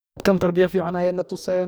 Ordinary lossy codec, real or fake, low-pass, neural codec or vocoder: none; fake; none; codec, 44.1 kHz, 2.6 kbps, DAC